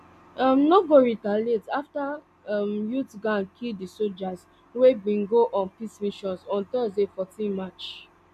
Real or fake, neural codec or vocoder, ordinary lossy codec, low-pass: real; none; none; 14.4 kHz